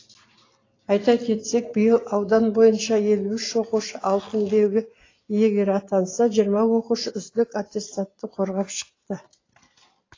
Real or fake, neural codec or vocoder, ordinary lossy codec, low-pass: real; none; AAC, 32 kbps; 7.2 kHz